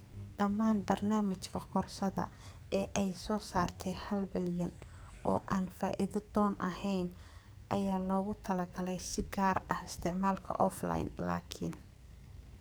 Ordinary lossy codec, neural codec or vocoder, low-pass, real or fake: none; codec, 44.1 kHz, 2.6 kbps, SNAC; none; fake